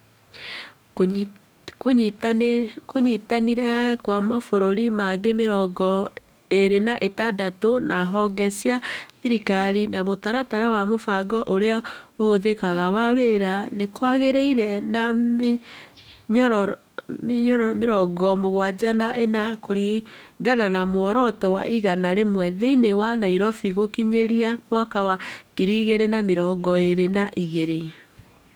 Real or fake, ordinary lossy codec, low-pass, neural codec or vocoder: fake; none; none; codec, 44.1 kHz, 2.6 kbps, DAC